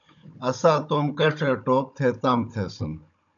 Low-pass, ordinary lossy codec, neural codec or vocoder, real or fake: 7.2 kHz; MP3, 96 kbps; codec, 16 kHz, 16 kbps, FunCodec, trained on Chinese and English, 50 frames a second; fake